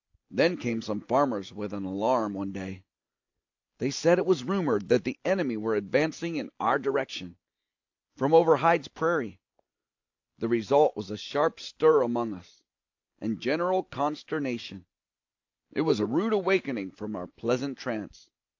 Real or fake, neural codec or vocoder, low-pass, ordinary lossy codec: real; none; 7.2 kHz; AAC, 48 kbps